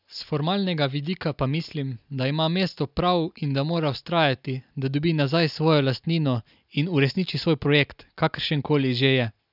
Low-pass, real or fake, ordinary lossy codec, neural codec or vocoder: 5.4 kHz; real; none; none